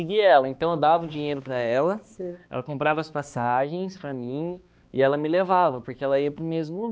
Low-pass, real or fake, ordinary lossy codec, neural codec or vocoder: none; fake; none; codec, 16 kHz, 2 kbps, X-Codec, HuBERT features, trained on balanced general audio